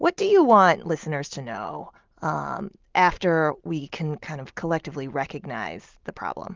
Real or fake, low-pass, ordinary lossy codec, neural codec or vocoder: real; 7.2 kHz; Opus, 32 kbps; none